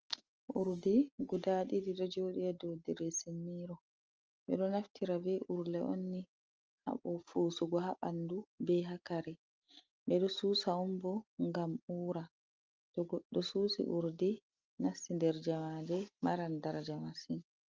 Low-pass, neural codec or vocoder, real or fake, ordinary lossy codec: 7.2 kHz; none; real; Opus, 24 kbps